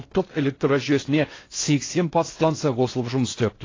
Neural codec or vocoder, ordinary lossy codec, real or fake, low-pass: codec, 16 kHz in and 24 kHz out, 0.6 kbps, FocalCodec, streaming, 4096 codes; AAC, 32 kbps; fake; 7.2 kHz